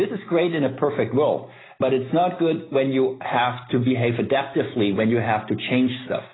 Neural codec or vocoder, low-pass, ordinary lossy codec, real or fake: none; 7.2 kHz; AAC, 16 kbps; real